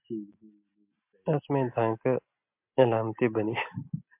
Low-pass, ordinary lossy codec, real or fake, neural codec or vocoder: 3.6 kHz; MP3, 32 kbps; real; none